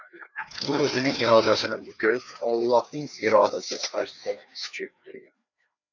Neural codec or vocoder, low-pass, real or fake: codec, 16 kHz, 2 kbps, FreqCodec, larger model; 7.2 kHz; fake